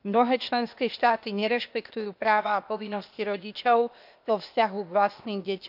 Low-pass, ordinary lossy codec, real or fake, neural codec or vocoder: 5.4 kHz; none; fake; codec, 16 kHz, 0.8 kbps, ZipCodec